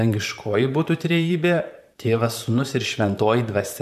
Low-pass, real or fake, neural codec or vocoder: 14.4 kHz; fake; vocoder, 44.1 kHz, 128 mel bands, Pupu-Vocoder